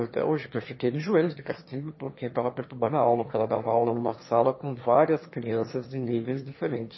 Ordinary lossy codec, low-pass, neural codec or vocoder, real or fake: MP3, 24 kbps; 7.2 kHz; autoencoder, 22.05 kHz, a latent of 192 numbers a frame, VITS, trained on one speaker; fake